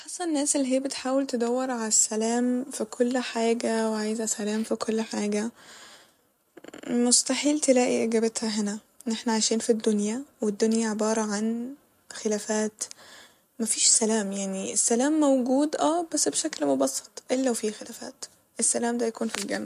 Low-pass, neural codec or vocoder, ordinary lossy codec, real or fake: 14.4 kHz; none; MP3, 64 kbps; real